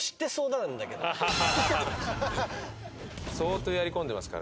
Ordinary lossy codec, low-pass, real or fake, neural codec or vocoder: none; none; real; none